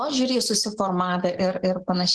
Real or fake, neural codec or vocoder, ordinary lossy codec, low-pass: real; none; Opus, 16 kbps; 10.8 kHz